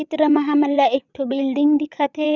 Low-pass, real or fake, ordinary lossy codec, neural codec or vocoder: 7.2 kHz; fake; none; vocoder, 22.05 kHz, 80 mel bands, WaveNeXt